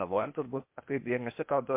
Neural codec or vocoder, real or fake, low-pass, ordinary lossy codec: codec, 16 kHz, 0.8 kbps, ZipCodec; fake; 3.6 kHz; MP3, 32 kbps